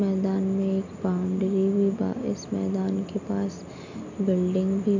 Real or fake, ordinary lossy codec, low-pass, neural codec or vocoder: real; AAC, 48 kbps; 7.2 kHz; none